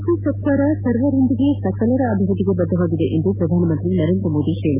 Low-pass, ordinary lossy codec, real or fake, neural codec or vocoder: 3.6 kHz; AAC, 32 kbps; real; none